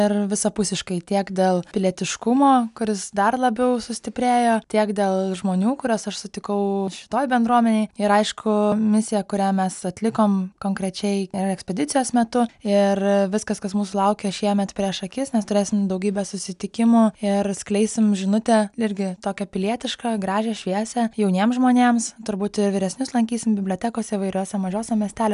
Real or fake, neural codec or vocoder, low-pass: real; none; 10.8 kHz